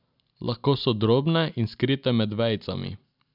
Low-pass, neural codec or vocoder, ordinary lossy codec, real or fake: 5.4 kHz; none; none; real